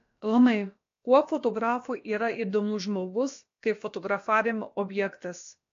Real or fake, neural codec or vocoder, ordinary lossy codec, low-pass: fake; codec, 16 kHz, about 1 kbps, DyCAST, with the encoder's durations; AAC, 48 kbps; 7.2 kHz